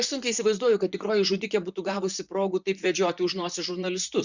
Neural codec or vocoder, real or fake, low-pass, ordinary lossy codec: vocoder, 44.1 kHz, 128 mel bands, Pupu-Vocoder; fake; 7.2 kHz; Opus, 64 kbps